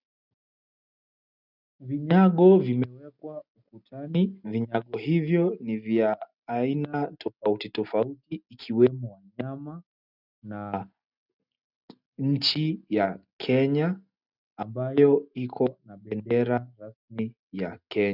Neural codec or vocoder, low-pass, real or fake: none; 5.4 kHz; real